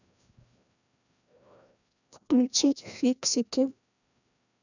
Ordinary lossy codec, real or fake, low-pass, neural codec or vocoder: none; fake; 7.2 kHz; codec, 16 kHz, 1 kbps, FreqCodec, larger model